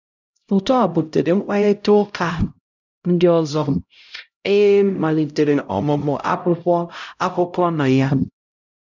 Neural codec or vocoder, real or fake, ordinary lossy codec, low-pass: codec, 16 kHz, 0.5 kbps, X-Codec, HuBERT features, trained on LibriSpeech; fake; none; 7.2 kHz